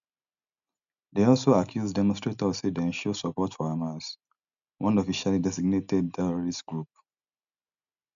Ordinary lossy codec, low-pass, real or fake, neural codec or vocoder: none; 7.2 kHz; real; none